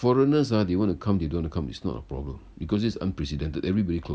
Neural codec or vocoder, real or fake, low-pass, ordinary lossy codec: none; real; none; none